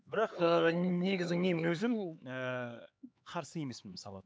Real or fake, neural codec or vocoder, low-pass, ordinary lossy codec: fake; codec, 16 kHz, 4 kbps, X-Codec, HuBERT features, trained on LibriSpeech; none; none